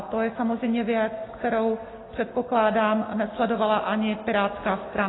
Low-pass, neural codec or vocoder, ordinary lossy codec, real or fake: 7.2 kHz; none; AAC, 16 kbps; real